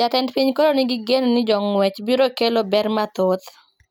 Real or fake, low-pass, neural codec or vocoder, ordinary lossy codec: real; none; none; none